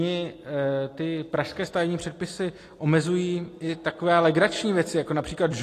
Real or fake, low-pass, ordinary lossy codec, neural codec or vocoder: real; 14.4 kHz; AAC, 48 kbps; none